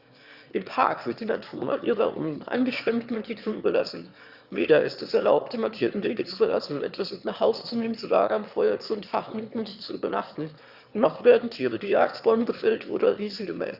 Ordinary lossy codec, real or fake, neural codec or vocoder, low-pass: Opus, 64 kbps; fake; autoencoder, 22.05 kHz, a latent of 192 numbers a frame, VITS, trained on one speaker; 5.4 kHz